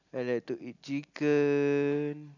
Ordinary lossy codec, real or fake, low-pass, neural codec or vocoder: none; real; 7.2 kHz; none